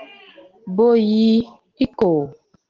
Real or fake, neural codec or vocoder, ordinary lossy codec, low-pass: real; none; Opus, 16 kbps; 7.2 kHz